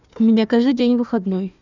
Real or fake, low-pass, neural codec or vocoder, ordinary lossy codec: fake; 7.2 kHz; codec, 16 kHz, 1 kbps, FunCodec, trained on Chinese and English, 50 frames a second; none